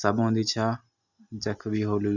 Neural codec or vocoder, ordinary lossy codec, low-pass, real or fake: none; none; 7.2 kHz; real